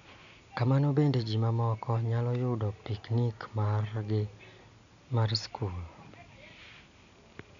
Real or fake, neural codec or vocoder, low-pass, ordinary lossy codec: real; none; 7.2 kHz; none